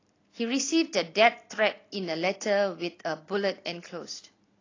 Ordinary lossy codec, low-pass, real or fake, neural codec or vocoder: AAC, 32 kbps; 7.2 kHz; real; none